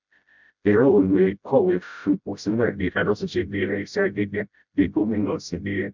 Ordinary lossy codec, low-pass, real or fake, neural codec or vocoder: MP3, 64 kbps; 7.2 kHz; fake; codec, 16 kHz, 0.5 kbps, FreqCodec, smaller model